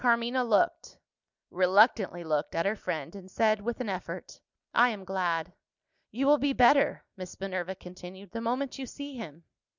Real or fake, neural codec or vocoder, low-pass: real; none; 7.2 kHz